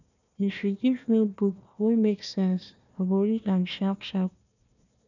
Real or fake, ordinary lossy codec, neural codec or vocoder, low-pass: fake; none; codec, 16 kHz, 1 kbps, FunCodec, trained on Chinese and English, 50 frames a second; 7.2 kHz